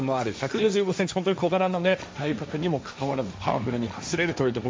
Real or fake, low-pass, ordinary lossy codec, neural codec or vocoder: fake; none; none; codec, 16 kHz, 1.1 kbps, Voila-Tokenizer